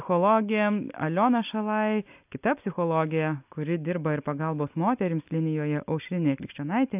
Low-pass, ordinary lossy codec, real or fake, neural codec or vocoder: 3.6 kHz; AAC, 32 kbps; real; none